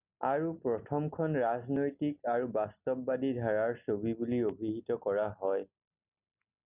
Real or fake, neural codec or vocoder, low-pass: real; none; 3.6 kHz